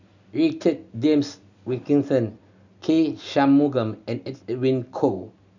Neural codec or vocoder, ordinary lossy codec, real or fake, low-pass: none; none; real; 7.2 kHz